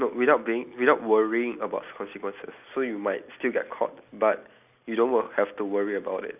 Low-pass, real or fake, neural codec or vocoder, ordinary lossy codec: 3.6 kHz; real; none; none